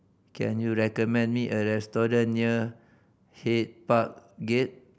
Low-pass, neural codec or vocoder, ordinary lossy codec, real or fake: none; none; none; real